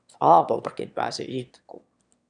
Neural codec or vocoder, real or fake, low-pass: autoencoder, 22.05 kHz, a latent of 192 numbers a frame, VITS, trained on one speaker; fake; 9.9 kHz